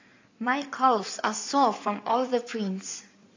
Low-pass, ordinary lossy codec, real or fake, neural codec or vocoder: 7.2 kHz; AAC, 48 kbps; fake; codec, 16 kHz in and 24 kHz out, 2.2 kbps, FireRedTTS-2 codec